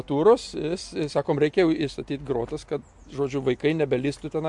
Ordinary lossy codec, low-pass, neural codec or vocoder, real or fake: MP3, 64 kbps; 10.8 kHz; none; real